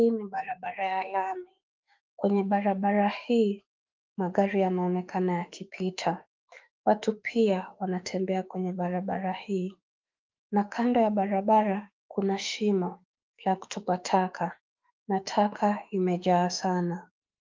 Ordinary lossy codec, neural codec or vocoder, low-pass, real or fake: Opus, 32 kbps; autoencoder, 48 kHz, 32 numbers a frame, DAC-VAE, trained on Japanese speech; 7.2 kHz; fake